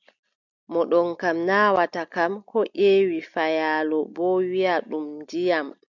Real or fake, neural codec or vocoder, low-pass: real; none; 7.2 kHz